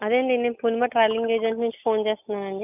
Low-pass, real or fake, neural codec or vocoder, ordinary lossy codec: 3.6 kHz; real; none; none